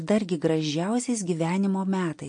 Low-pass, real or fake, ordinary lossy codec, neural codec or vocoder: 9.9 kHz; real; MP3, 48 kbps; none